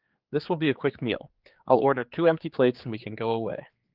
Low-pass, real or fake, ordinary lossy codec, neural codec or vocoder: 5.4 kHz; fake; Opus, 32 kbps; codec, 16 kHz, 4 kbps, X-Codec, HuBERT features, trained on general audio